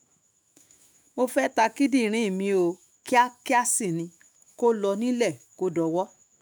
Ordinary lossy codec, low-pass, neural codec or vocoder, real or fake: none; none; autoencoder, 48 kHz, 128 numbers a frame, DAC-VAE, trained on Japanese speech; fake